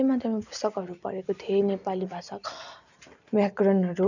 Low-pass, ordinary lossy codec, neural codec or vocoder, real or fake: 7.2 kHz; none; none; real